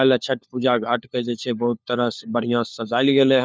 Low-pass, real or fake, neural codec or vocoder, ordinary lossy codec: none; fake; codec, 16 kHz, 4 kbps, FunCodec, trained on LibriTTS, 50 frames a second; none